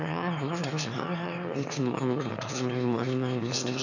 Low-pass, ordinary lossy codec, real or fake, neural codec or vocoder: 7.2 kHz; none; fake; autoencoder, 22.05 kHz, a latent of 192 numbers a frame, VITS, trained on one speaker